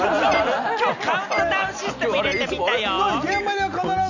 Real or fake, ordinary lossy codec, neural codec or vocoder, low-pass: real; none; none; 7.2 kHz